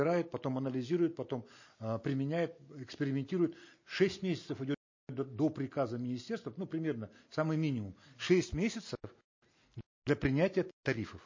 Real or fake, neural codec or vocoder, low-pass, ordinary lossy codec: real; none; 7.2 kHz; MP3, 32 kbps